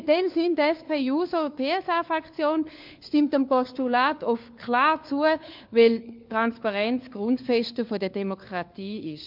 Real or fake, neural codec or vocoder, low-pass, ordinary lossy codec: fake; codec, 16 kHz, 4 kbps, FunCodec, trained on LibriTTS, 50 frames a second; 5.4 kHz; MP3, 48 kbps